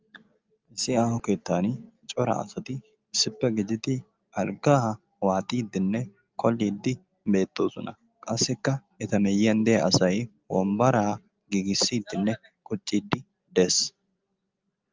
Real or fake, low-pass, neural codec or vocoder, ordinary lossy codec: real; 7.2 kHz; none; Opus, 24 kbps